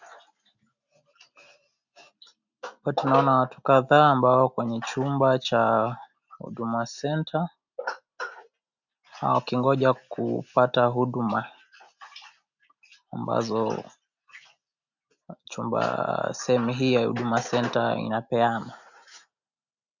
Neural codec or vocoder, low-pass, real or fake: none; 7.2 kHz; real